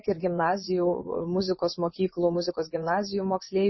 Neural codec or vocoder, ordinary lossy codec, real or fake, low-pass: vocoder, 24 kHz, 100 mel bands, Vocos; MP3, 24 kbps; fake; 7.2 kHz